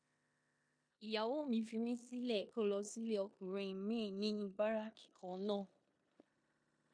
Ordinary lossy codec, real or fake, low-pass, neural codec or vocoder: MP3, 48 kbps; fake; 9.9 kHz; codec, 16 kHz in and 24 kHz out, 0.9 kbps, LongCat-Audio-Codec, four codebook decoder